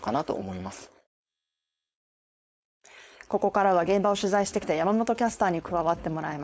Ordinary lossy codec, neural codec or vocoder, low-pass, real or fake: none; codec, 16 kHz, 4.8 kbps, FACodec; none; fake